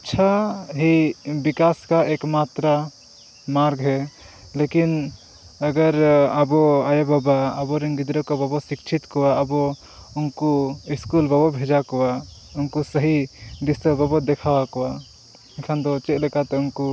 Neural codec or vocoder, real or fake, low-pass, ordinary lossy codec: none; real; none; none